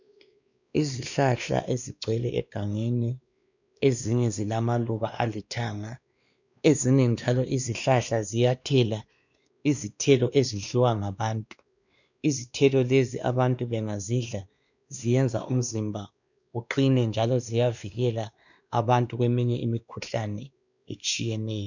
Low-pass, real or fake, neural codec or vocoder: 7.2 kHz; fake; codec, 16 kHz, 2 kbps, X-Codec, WavLM features, trained on Multilingual LibriSpeech